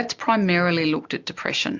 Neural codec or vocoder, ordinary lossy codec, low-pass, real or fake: none; MP3, 64 kbps; 7.2 kHz; real